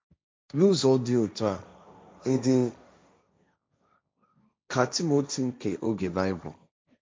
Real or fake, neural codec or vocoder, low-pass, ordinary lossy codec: fake; codec, 16 kHz, 1.1 kbps, Voila-Tokenizer; none; none